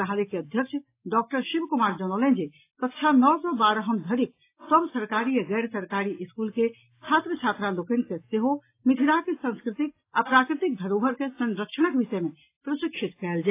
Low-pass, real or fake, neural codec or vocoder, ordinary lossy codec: 3.6 kHz; real; none; AAC, 24 kbps